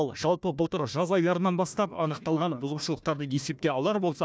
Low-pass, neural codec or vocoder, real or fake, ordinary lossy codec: none; codec, 16 kHz, 1 kbps, FunCodec, trained on Chinese and English, 50 frames a second; fake; none